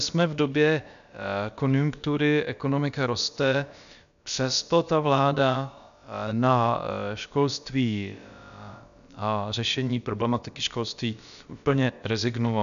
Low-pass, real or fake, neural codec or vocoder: 7.2 kHz; fake; codec, 16 kHz, about 1 kbps, DyCAST, with the encoder's durations